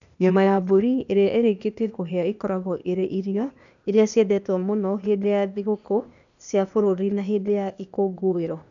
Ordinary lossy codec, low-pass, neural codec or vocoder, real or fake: none; 7.2 kHz; codec, 16 kHz, 0.8 kbps, ZipCodec; fake